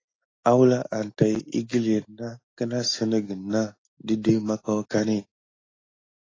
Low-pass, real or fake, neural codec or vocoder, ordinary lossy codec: 7.2 kHz; real; none; AAC, 32 kbps